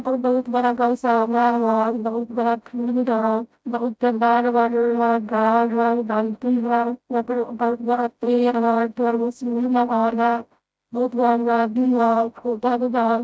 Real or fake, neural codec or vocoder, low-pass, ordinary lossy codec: fake; codec, 16 kHz, 0.5 kbps, FreqCodec, smaller model; none; none